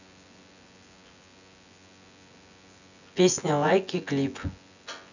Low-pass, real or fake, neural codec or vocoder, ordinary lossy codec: 7.2 kHz; fake; vocoder, 24 kHz, 100 mel bands, Vocos; none